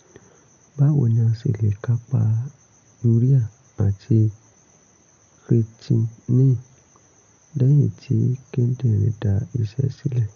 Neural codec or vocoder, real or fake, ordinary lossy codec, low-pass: none; real; none; 7.2 kHz